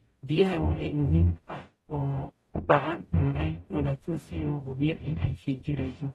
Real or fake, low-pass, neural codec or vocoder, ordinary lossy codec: fake; 19.8 kHz; codec, 44.1 kHz, 0.9 kbps, DAC; AAC, 32 kbps